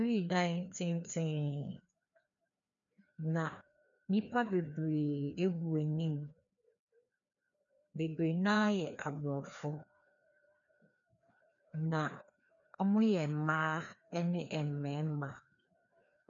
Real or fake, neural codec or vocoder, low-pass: fake; codec, 16 kHz, 2 kbps, FreqCodec, larger model; 7.2 kHz